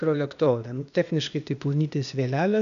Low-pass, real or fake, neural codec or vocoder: 7.2 kHz; fake; codec, 16 kHz, 0.8 kbps, ZipCodec